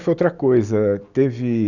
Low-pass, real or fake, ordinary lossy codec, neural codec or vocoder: 7.2 kHz; real; none; none